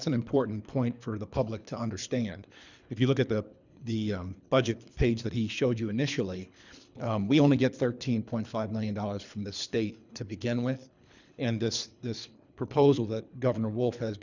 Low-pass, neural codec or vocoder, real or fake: 7.2 kHz; codec, 24 kHz, 3 kbps, HILCodec; fake